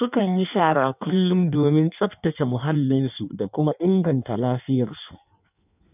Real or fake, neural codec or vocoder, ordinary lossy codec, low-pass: fake; codec, 16 kHz in and 24 kHz out, 1.1 kbps, FireRedTTS-2 codec; none; 3.6 kHz